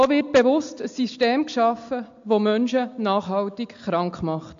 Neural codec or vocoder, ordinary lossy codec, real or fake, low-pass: none; AAC, 64 kbps; real; 7.2 kHz